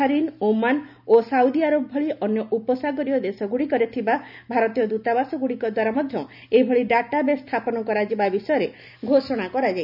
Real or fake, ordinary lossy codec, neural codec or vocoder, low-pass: real; none; none; 5.4 kHz